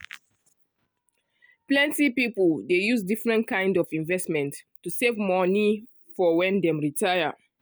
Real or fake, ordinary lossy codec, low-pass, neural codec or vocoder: real; none; none; none